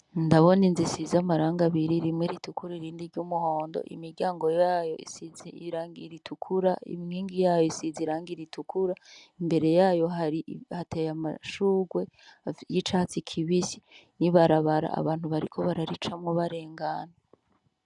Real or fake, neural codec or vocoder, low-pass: real; none; 10.8 kHz